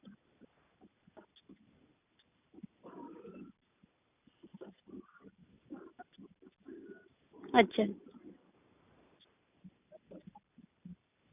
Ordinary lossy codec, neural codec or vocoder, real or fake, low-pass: none; none; real; 3.6 kHz